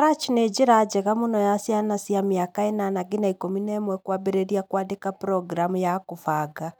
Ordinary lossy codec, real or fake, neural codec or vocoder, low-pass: none; real; none; none